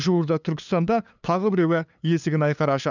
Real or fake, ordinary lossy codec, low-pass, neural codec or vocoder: fake; none; 7.2 kHz; codec, 16 kHz, 2 kbps, FunCodec, trained on LibriTTS, 25 frames a second